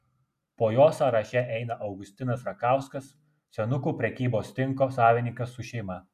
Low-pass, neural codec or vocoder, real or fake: 14.4 kHz; none; real